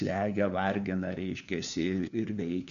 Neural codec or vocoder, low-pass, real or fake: codec, 16 kHz, 4 kbps, FunCodec, trained on LibriTTS, 50 frames a second; 7.2 kHz; fake